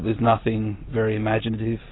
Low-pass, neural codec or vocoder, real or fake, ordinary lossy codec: 7.2 kHz; none; real; AAC, 16 kbps